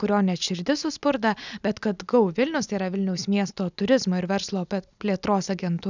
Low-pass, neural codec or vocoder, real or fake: 7.2 kHz; none; real